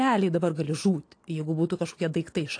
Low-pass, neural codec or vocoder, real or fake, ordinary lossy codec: 9.9 kHz; none; real; AAC, 48 kbps